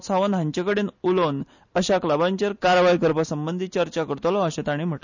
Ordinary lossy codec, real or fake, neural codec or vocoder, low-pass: none; real; none; 7.2 kHz